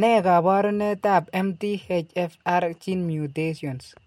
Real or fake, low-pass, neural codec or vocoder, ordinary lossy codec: real; 19.8 kHz; none; MP3, 64 kbps